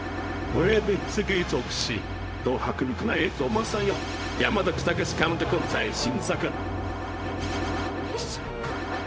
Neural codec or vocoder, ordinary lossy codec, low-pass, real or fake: codec, 16 kHz, 0.4 kbps, LongCat-Audio-Codec; none; none; fake